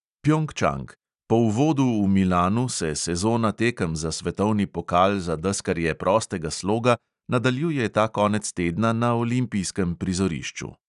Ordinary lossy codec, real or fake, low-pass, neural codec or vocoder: none; real; 10.8 kHz; none